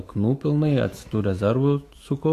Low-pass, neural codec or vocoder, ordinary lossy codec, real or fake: 14.4 kHz; autoencoder, 48 kHz, 128 numbers a frame, DAC-VAE, trained on Japanese speech; AAC, 48 kbps; fake